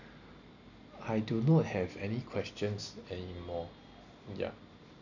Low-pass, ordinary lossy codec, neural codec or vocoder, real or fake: 7.2 kHz; none; none; real